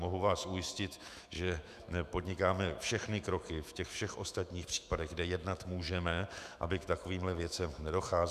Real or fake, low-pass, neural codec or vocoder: fake; 14.4 kHz; autoencoder, 48 kHz, 128 numbers a frame, DAC-VAE, trained on Japanese speech